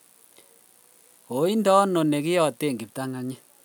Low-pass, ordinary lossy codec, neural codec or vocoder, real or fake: none; none; none; real